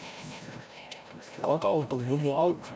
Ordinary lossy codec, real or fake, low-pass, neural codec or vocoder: none; fake; none; codec, 16 kHz, 0.5 kbps, FreqCodec, larger model